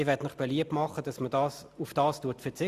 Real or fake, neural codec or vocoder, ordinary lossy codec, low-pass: real; none; Opus, 64 kbps; 14.4 kHz